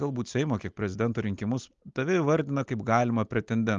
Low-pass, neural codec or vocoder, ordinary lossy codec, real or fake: 7.2 kHz; none; Opus, 32 kbps; real